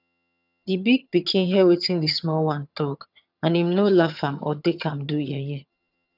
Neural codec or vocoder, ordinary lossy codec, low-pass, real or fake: vocoder, 22.05 kHz, 80 mel bands, HiFi-GAN; none; 5.4 kHz; fake